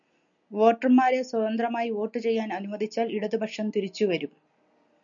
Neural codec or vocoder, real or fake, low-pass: none; real; 7.2 kHz